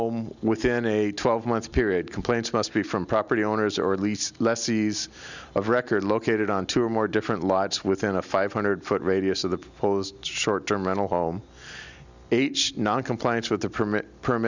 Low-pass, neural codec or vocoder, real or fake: 7.2 kHz; none; real